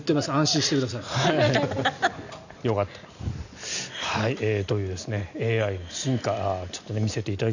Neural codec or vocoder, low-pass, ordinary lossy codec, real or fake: none; 7.2 kHz; none; real